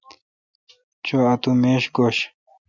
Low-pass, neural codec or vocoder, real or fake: 7.2 kHz; none; real